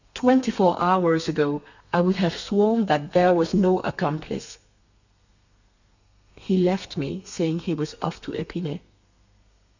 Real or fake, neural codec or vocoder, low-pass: fake; codec, 32 kHz, 1.9 kbps, SNAC; 7.2 kHz